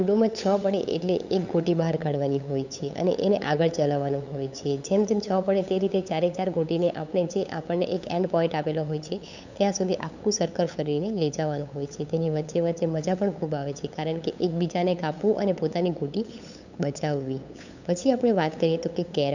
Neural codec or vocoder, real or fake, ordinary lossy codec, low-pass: codec, 16 kHz, 8 kbps, FunCodec, trained on LibriTTS, 25 frames a second; fake; none; 7.2 kHz